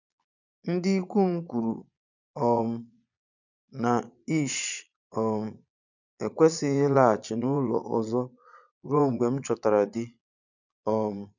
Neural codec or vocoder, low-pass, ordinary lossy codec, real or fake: vocoder, 22.05 kHz, 80 mel bands, Vocos; 7.2 kHz; none; fake